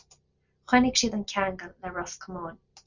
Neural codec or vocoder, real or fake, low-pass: none; real; 7.2 kHz